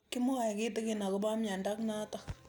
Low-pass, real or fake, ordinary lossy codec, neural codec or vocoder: none; real; none; none